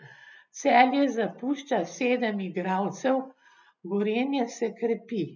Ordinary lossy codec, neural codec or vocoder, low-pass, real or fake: none; none; 7.2 kHz; real